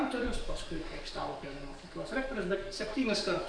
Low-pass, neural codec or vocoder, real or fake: 9.9 kHz; codec, 44.1 kHz, 7.8 kbps, DAC; fake